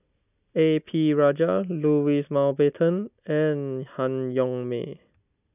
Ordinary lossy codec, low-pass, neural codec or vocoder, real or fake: none; 3.6 kHz; none; real